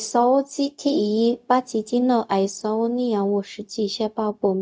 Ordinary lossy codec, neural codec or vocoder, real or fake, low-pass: none; codec, 16 kHz, 0.4 kbps, LongCat-Audio-Codec; fake; none